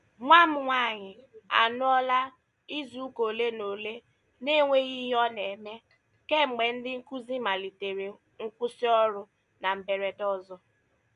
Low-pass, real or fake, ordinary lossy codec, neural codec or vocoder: 10.8 kHz; real; none; none